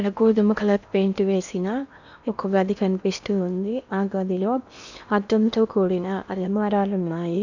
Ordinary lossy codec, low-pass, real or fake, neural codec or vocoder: none; 7.2 kHz; fake; codec, 16 kHz in and 24 kHz out, 0.8 kbps, FocalCodec, streaming, 65536 codes